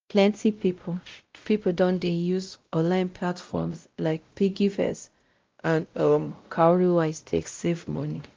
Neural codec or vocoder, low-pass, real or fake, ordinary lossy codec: codec, 16 kHz, 0.5 kbps, X-Codec, WavLM features, trained on Multilingual LibriSpeech; 7.2 kHz; fake; Opus, 24 kbps